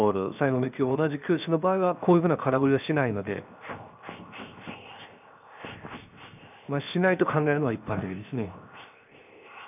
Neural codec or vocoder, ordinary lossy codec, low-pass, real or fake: codec, 16 kHz, 0.7 kbps, FocalCodec; none; 3.6 kHz; fake